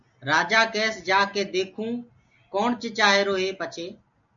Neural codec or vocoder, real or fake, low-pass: none; real; 7.2 kHz